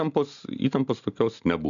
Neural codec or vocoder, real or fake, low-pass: none; real; 7.2 kHz